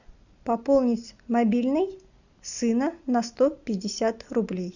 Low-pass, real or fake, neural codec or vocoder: 7.2 kHz; real; none